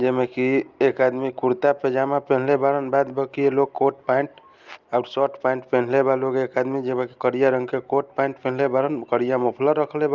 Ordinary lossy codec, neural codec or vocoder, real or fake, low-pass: Opus, 24 kbps; none; real; 7.2 kHz